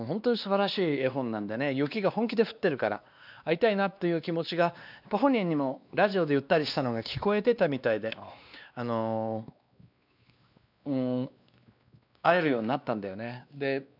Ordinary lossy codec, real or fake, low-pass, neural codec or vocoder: none; fake; 5.4 kHz; codec, 16 kHz, 2 kbps, X-Codec, WavLM features, trained on Multilingual LibriSpeech